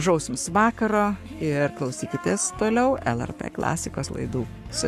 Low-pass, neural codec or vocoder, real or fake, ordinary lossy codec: 14.4 kHz; codec, 44.1 kHz, 7.8 kbps, Pupu-Codec; fake; AAC, 96 kbps